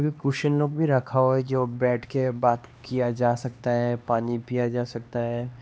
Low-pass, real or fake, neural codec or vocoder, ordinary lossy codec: none; fake; codec, 16 kHz, 2 kbps, X-Codec, HuBERT features, trained on LibriSpeech; none